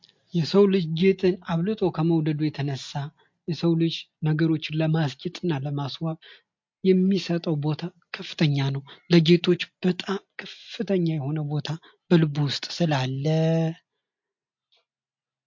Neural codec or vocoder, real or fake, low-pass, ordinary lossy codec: none; real; 7.2 kHz; MP3, 48 kbps